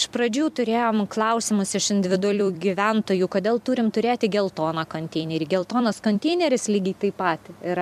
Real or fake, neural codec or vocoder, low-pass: fake; vocoder, 44.1 kHz, 128 mel bands every 256 samples, BigVGAN v2; 14.4 kHz